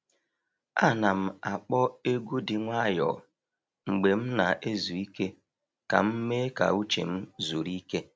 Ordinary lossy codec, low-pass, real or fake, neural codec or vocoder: none; none; real; none